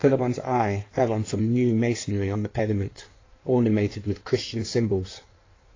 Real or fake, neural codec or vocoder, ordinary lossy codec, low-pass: fake; codec, 16 kHz in and 24 kHz out, 1.1 kbps, FireRedTTS-2 codec; AAC, 32 kbps; 7.2 kHz